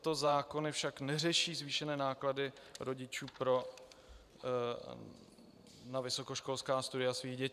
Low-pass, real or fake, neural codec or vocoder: 14.4 kHz; fake; vocoder, 44.1 kHz, 128 mel bands every 512 samples, BigVGAN v2